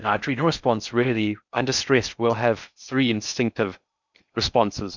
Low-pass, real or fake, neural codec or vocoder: 7.2 kHz; fake; codec, 16 kHz in and 24 kHz out, 0.6 kbps, FocalCodec, streaming, 4096 codes